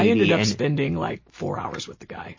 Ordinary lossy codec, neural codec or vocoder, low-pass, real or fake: MP3, 32 kbps; none; 7.2 kHz; real